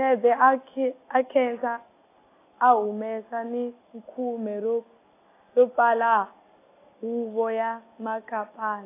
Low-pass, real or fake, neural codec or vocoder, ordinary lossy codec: 3.6 kHz; real; none; AAC, 24 kbps